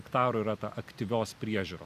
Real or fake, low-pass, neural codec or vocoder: real; 14.4 kHz; none